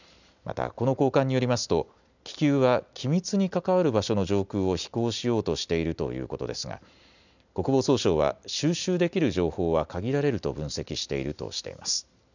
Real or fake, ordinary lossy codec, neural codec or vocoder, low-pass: real; none; none; 7.2 kHz